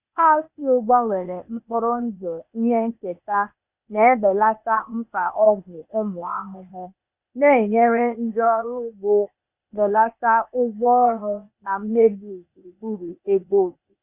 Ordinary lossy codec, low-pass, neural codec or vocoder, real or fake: Opus, 64 kbps; 3.6 kHz; codec, 16 kHz, 0.8 kbps, ZipCodec; fake